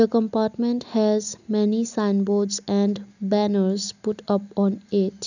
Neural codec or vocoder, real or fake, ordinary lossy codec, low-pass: none; real; none; 7.2 kHz